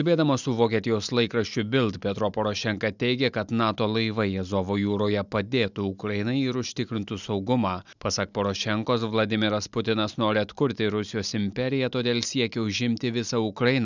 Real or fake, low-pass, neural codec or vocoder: real; 7.2 kHz; none